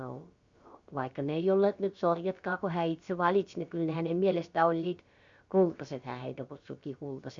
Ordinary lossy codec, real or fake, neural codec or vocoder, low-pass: Opus, 64 kbps; fake; codec, 16 kHz, about 1 kbps, DyCAST, with the encoder's durations; 7.2 kHz